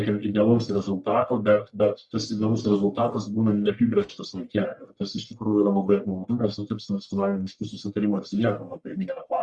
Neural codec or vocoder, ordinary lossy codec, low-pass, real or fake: codec, 44.1 kHz, 1.7 kbps, Pupu-Codec; Opus, 64 kbps; 10.8 kHz; fake